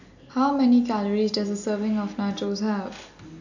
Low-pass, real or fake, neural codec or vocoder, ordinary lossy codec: 7.2 kHz; real; none; none